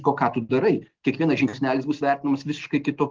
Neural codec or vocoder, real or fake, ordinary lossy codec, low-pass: none; real; Opus, 16 kbps; 7.2 kHz